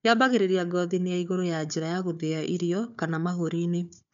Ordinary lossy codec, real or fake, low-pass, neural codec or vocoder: MP3, 64 kbps; fake; 7.2 kHz; codec, 16 kHz, 4 kbps, FunCodec, trained on Chinese and English, 50 frames a second